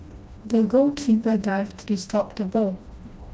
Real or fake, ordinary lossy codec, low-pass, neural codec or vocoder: fake; none; none; codec, 16 kHz, 1 kbps, FreqCodec, smaller model